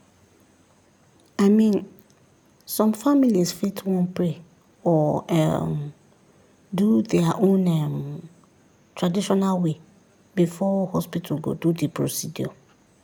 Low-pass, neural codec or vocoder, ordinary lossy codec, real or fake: none; none; none; real